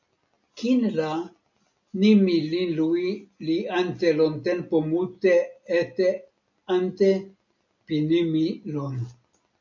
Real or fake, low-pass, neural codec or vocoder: real; 7.2 kHz; none